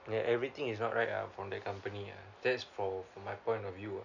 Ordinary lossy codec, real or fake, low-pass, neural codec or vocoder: none; real; 7.2 kHz; none